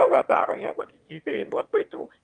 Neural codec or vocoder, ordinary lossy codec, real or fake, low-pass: autoencoder, 22.05 kHz, a latent of 192 numbers a frame, VITS, trained on one speaker; Opus, 32 kbps; fake; 9.9 kHz